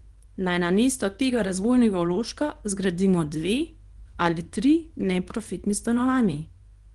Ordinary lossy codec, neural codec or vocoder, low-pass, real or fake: Opus, 24 kbps; codec, 24 kHz, 0.9 kbps, WavTokenizer, small release; 10.8 kHz; fake